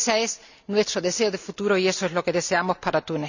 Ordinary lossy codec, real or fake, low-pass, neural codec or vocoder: none; real; 7.2 kHz; none